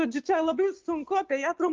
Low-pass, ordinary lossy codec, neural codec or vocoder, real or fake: 7.2 kHz; Opus, 24 kbps; none; real